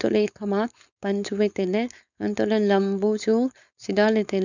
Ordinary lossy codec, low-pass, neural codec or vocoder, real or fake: none; 7.2 kHz; codec, 16 kHz, 4.8 kbps, FACodec; fake